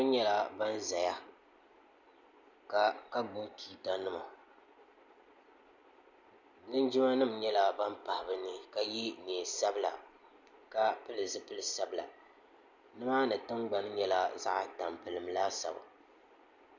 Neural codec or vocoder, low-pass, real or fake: none; 7.2 kHz; real